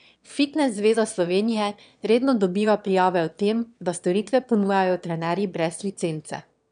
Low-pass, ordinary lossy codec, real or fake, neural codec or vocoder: 9.9 kHz; none; fake; autoencoder, 22.05 kHz, a latent of 192 numbers a frame, VITS, trained on one speaker